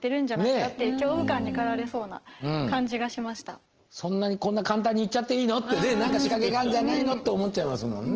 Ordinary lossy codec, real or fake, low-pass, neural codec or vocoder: Opus, 16 kbps; real; 7.2 kHz; none